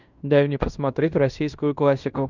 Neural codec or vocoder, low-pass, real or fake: codec, 16 kHz in and 24 kHz out, 0.9 kbps, LongCat-Audio-Codec, fine tuned four codebook decoder; 7.2 kHz; fake